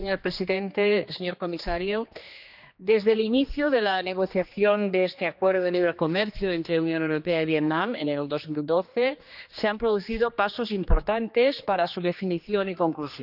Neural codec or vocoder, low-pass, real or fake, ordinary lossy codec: codec, 16 kHz, 2 kbps, X-Codec, HuBERT features, trained on general audio; 5.4 kHz; fake; none